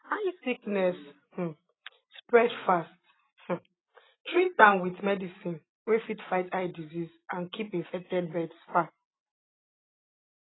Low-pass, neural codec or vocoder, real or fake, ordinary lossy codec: 7.2 kHz; none; real; AAC, 16 kbps